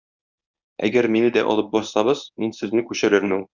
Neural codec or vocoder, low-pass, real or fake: codec, 24 kHz, 0.9 kbps, WavTokenizer, medium speech release version 1; 7.2 kHz; fake